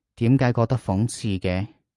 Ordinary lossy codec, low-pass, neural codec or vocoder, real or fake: Opus, 32 kbps; 10.8 kHz; none; real